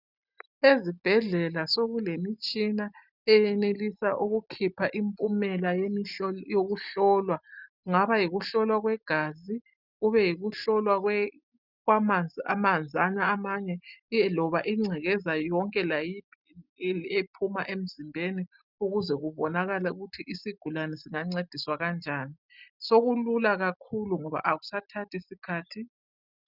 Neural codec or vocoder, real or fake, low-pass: none; real; 5.4 kHz